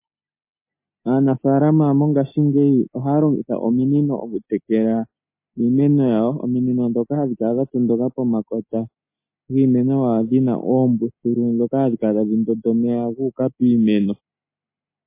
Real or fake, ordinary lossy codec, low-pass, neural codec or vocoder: real; MP3, 24 kbps; 3.6 kHz; none